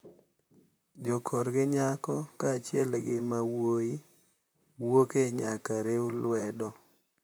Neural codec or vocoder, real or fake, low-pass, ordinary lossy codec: vocoder, 44.1 kHz, 128 mel bands, Pupu-Vocoder; fake; none; none